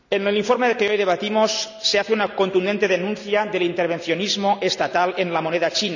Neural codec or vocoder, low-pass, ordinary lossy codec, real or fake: none; 7.2 kHz; none; real